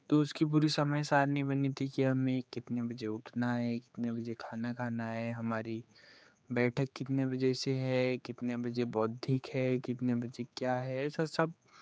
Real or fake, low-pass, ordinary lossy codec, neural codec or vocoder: fake; none; none; codec, 16 kHz, 4 kbps, X-Codec, HuBERT features, trained on general audio